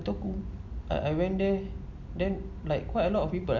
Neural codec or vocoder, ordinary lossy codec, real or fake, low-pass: none; none; real; 7.2 kHz